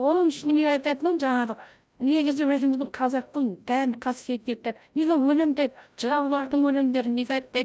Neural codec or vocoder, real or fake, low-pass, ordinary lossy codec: codec, 16 kHz, 0.5 kbps, FreqCodec, larger model; fake; none; none